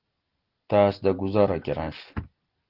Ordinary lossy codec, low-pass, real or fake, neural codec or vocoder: Opus, 16 kbps; 5.4 kHz; real; none